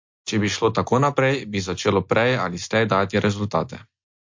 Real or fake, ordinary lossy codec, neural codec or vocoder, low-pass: real; MP3, 48 kbps; none; 7.2 kHz